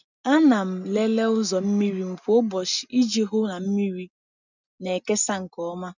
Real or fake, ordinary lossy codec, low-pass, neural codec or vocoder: real; none; 7.2 kHz; none